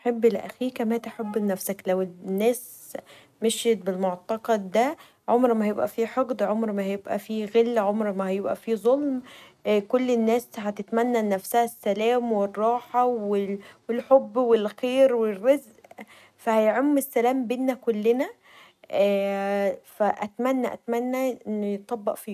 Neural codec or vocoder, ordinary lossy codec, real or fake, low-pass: none; none; real; 14.4 kHz